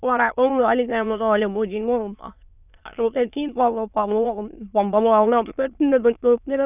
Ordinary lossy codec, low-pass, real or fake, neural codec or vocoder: none; 3.6 kHz; fake; autoencoder, 22.05 kHz, a latent of 192 numbers a frame, VITS, trained on many speakers